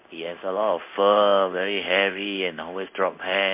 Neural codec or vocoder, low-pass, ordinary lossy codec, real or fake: codec, 16 kHz in and 24 kHz out, 1 kbps, XY-Tokenizer; 3.6 kHz; MP3, 32 kbps; fake